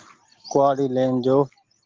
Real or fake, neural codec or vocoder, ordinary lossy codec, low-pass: real; none; Opus, 16 kbps; 7.2 kHz